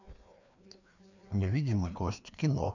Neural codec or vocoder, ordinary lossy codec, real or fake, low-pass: codec, 16 kHz, 2 kbps, FreqCodec, larger model; none; fake; 7.2 kHz